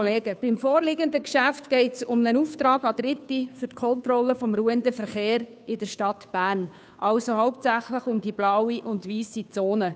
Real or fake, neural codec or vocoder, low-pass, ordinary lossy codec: fake; codec, 16 kHz, 2 kbps, FunCodec, trained on Chinese and English, 25 frames a second; none; none